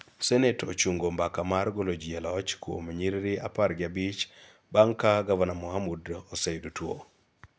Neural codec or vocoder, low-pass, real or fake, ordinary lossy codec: none; none; real; none